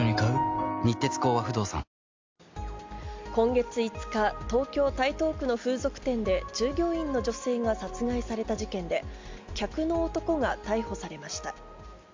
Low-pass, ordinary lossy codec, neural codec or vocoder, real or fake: 7.2 kHz; MP3, 64 kbps; none; real